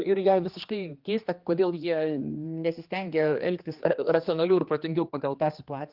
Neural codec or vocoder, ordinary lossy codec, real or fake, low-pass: codec, 16 kHz, 2 kbps, X-Codec, HuBERT features, trained on general audio; Opus, 32 kbps; fake; 5.4 kHz